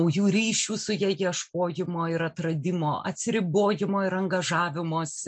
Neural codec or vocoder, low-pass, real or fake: none; 9.9 kHz; real